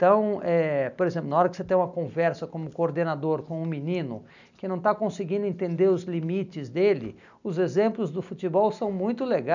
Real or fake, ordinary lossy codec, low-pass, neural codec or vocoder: real; none; 7.2 kHz; none